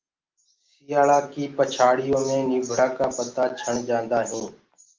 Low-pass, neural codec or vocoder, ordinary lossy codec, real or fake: 7.2 kHz; none; Opus, 32 kbps; real